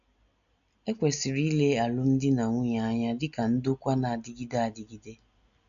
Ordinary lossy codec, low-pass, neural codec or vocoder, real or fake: none; 7.2 kHz; none; real